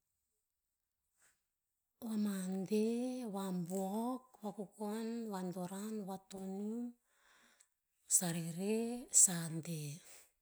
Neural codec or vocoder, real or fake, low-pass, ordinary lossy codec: none; real; none; none